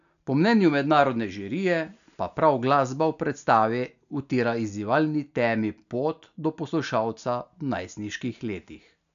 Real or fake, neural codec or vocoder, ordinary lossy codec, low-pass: real; none; none; 7.2 kHz